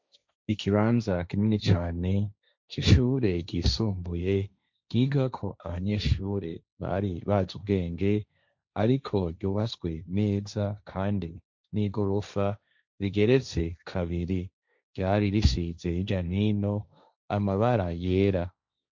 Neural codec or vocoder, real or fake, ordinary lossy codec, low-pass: codec, 16 kHz, 1.1 kbps, Voila-Tokenizer; fake; MP3, 64 kbps; 7.2 kHz